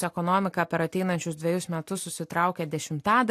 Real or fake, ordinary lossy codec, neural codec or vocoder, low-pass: real; AAC, 64 kbps; none; 14.4 kHz